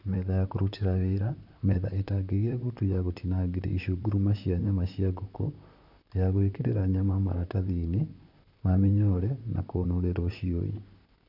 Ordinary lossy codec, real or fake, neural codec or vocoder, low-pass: none; fake; vocoder, 44.1 kHz, 128 mel bands, Pupu-Vocoder; 5.4 kHz